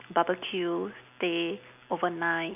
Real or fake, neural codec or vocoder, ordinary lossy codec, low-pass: real; none; none; 3.6 kHz